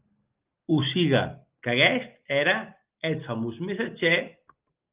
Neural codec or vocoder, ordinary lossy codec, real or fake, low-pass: none; Opus, 24 kbps; real; 3.6 kHz